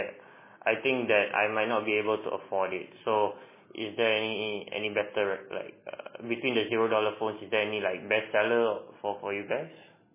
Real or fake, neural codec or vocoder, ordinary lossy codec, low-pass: real; none; MP3, 16 kbps; 3.6 kHz